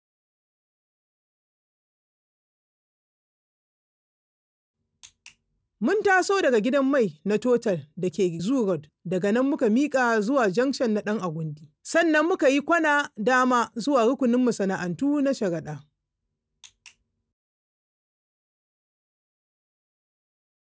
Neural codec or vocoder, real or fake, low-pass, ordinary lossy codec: none; real; none; none